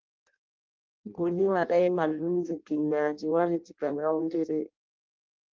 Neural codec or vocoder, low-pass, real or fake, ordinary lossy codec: codec, 16 kHz in and 24 kHz out, 0.6 kbps, FireRedTTS-2 codec; 7.2 kHz; fake; Opus, 32 kbps